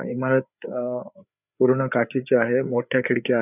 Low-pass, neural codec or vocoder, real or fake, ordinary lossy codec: 3.6 kHz; codec, 16 kHz, 4 kbps, FreqCodec, larger model; fake; none